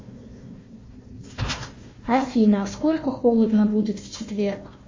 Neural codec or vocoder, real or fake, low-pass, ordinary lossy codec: codec, 16 kHz, 1 kbps, FunCodec, trained on Chinese and English, 50 frames a second; fake; 7.2 kHz; MP3, 32 kbps